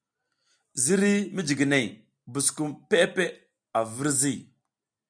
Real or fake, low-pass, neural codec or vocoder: real; 9.9 kHz; none